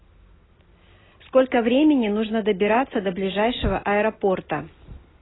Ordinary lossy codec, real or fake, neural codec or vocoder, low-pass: AAC, 16 kbps; real; none; 7.2 kHz